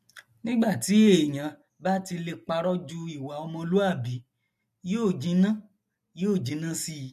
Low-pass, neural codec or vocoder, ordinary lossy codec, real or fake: 14.4 kHz; none; MP3, 64 kbps; real